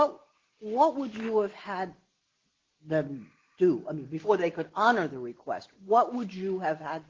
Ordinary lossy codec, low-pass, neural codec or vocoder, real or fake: Opus, 16 kbps; 7.2 kHz; none; real